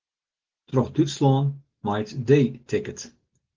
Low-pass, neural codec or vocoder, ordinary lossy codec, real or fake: 7.2 kHz; none; Opus, 16 kbps; real